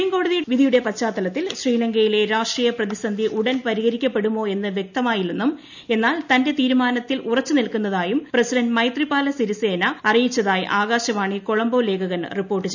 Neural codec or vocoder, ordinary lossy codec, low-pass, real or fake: none; none; 7.2 kHz; real